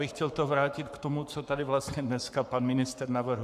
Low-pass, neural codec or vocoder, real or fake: 14.4 kHz; codec, 44.1 kHz, 7.8 kbps, Pupu-Codec; fake